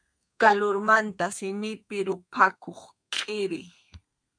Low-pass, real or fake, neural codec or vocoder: 9.9 kHz; fake; codec, 32 kHz, 1.9 kbps, SNAC